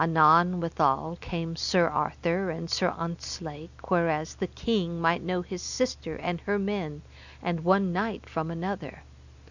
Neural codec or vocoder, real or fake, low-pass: none; real; 7.2 kHz